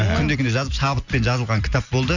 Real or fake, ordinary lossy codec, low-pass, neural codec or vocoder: real; none; 7.2 kHz; none